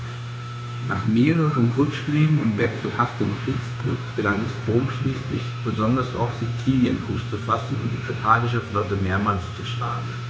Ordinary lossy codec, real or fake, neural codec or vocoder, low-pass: none; fake; codec, 16 kHz, 0.9 kbps, LongCat-Audio-Codec; none